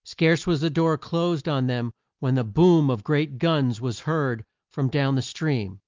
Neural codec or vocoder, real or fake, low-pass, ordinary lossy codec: none; real; 7.2 kHz; Opus, 32 kbps